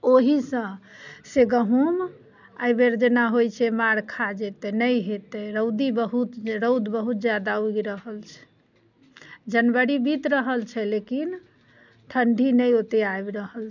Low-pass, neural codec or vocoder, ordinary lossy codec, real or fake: 7.2 kHz; none; none; real